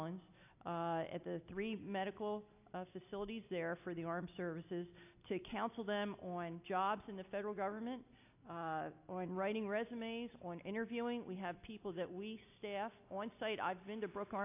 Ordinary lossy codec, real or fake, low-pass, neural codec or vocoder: AAC, 32 kbps; real; 3.6 kHz; none